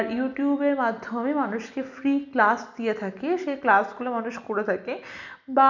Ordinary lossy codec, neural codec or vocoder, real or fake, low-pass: none; none; real; 7.2 kHz